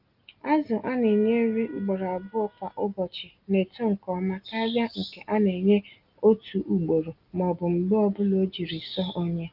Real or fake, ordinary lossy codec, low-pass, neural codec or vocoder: real; Opus, 32 kbps; 5.4 kHz; none